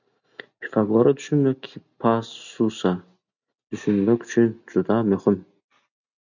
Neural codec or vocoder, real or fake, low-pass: none; real; 7.2 kHz